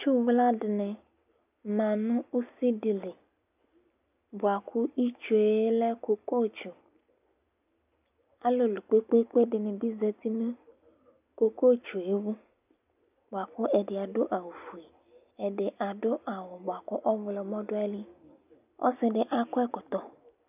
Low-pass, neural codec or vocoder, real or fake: 3.6 kHz; none; real